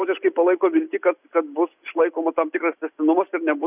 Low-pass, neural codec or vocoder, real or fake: 3.6 kHz; none; real